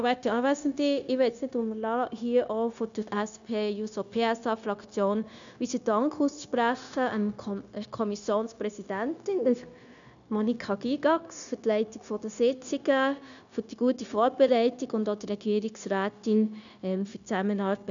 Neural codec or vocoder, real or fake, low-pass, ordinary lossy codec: codec, 16 kHz, 0.9 kbps, LongCat-Audio-Codec; fake; 7.2 kHz; none